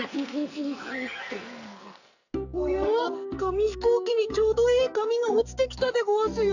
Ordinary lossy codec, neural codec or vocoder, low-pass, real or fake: none; codec, 44.1 kHz, 2.6 kbps, SNAC; 7.2 kHz; fake